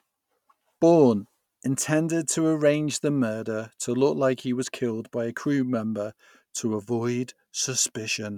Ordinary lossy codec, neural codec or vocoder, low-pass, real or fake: none; none; 19.8 kHz; real